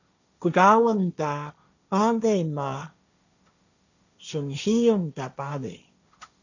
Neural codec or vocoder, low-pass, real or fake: codec, 16 kHz, 1.1 kbps, Voila-Tokenizer; 7.2 kHz; fake